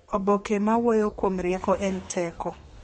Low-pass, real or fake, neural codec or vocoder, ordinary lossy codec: 14.4 kHz; fake; codec, 32 kHz, 1.9 kbps, SNAC; MP3, 48 kbps